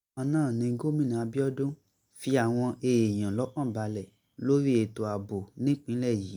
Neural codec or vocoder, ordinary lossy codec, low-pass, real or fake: none; MP3, 96 kbps; 19.8 kHz; real